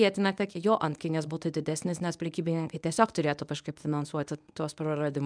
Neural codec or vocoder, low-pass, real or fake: codec, 24 kHz, 0.9 kbps, WavTokenizer, medium speech release version 1; 9.9 kHz; fake